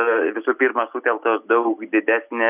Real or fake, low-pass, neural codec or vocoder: real; 3.6 kHz; none